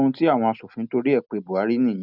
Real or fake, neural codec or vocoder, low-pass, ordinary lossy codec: real; none; 5.4 kHz; none